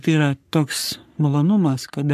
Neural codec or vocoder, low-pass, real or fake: codec, 44.1 kHz, 3.4 kbps, Pupu-Codec; 14.4 kHz; fake